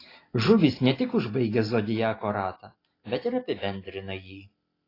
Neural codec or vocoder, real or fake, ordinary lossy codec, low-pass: none; real; AAC, 24 kbps; 5.4 kHz